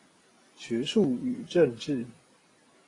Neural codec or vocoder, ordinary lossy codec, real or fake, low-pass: none; AAC, 32 kbps; real; 10.8 kHz